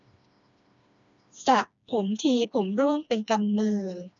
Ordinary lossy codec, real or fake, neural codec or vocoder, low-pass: none; fake; codec, 16 kHz, 2 kbps, FreqCodec, smaller model; 7.2 kHz